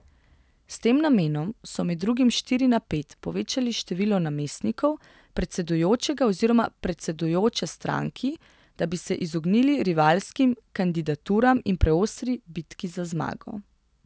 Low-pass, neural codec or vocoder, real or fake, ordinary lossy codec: none; none; real; none